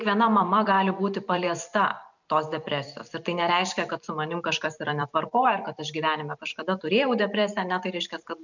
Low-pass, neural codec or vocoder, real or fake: 7.2 kHz; vocoder, 44.1 kHz, 128 mel bands every 256 samples, BigVGAN v2; fake